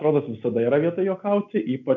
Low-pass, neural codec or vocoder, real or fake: 7.2 kHz; none; real